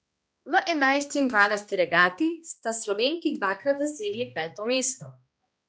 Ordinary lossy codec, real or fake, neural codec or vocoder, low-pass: none; fake; codec, 16 kHz, 1 kbps, X-Codec, HuBERT features, trained on balanced general audio; none